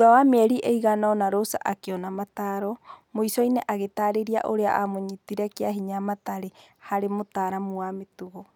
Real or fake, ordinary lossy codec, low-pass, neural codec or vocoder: real; none; 19.8 kHz; none